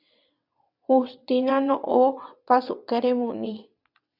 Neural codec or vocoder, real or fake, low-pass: vocoder, 22.05 kHz, 80 mel bands, WaveNeXt; fake; 5.4 kHz